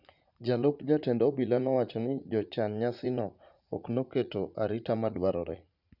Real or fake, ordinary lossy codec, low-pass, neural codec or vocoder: fake; none; 5.4 kHz; vocoder, 44.1 kHz, 80 mel bands, Vocos